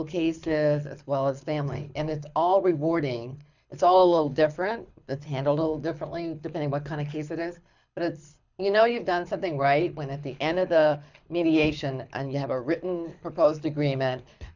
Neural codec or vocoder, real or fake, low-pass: codec, 24 kHz, 6 kbps, HILCodec; fake; 7.2 kHz